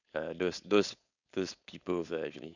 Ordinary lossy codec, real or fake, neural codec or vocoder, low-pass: none; fake; codec, 16 kHz, 4.8 kbps, FACodec; 7.2 kHz